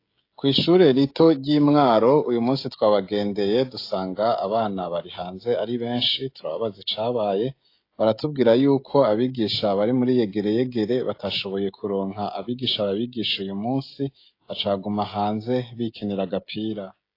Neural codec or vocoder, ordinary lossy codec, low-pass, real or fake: codec, 16 kHz, 16 kbps, FreqCodec, smaller model; AAC, 32 kbps; 5.4 kHz; fake